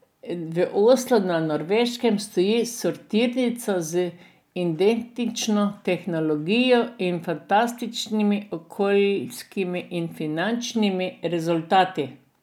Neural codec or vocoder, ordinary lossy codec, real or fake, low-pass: none; none; real; 19.8 kHz